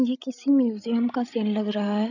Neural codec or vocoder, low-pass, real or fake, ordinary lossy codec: codec, 16 kHz, 16 kbps, FreqCodec, larger model; 7.2 kHz; fake; none